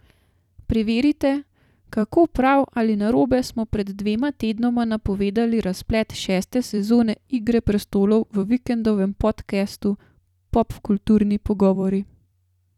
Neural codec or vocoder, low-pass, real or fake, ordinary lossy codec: vocoder, 44.1 kHz, 128 mel bands every 256 samples, BigVGAN v2; 19.8 kHz; fake; none